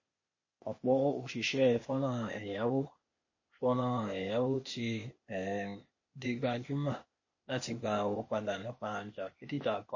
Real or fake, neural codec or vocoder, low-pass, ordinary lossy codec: fake; codec, 16 kHz, 0.8 kbps, ZipCodec; 7.2 kHz; MP3, 32 kbps